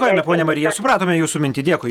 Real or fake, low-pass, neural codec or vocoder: fake; 19.8 kHz; vocoder, 48 kHz, 128 mel bands, Vocos